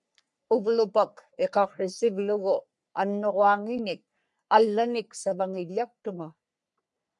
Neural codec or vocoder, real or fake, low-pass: codec, 44.1 kHz, 3.4 kbps, Pupu-Codec; fake; 10.8 kHz